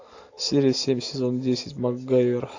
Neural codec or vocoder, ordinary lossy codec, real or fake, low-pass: none; AAC, 48 kbps; real; 7.2 kHz